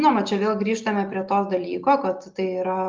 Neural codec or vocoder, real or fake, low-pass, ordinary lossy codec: none; real; 7.2 kHz; Opus, 24 kbps